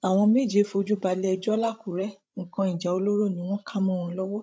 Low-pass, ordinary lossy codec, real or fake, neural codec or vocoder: none; none; fake; codec, 16 kHz, 16 kbps, FreqCodec, larger model